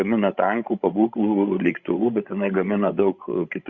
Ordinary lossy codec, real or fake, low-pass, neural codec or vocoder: Opus, 64 kbps; fake; 7.2 kHz; codec, 16 kHz, 16 kbps, FreqCodec, larger model